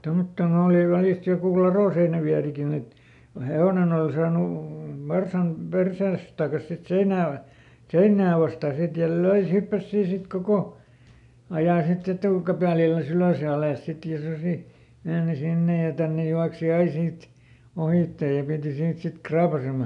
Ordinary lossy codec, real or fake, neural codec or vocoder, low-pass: none; real; none; 10.8 kHz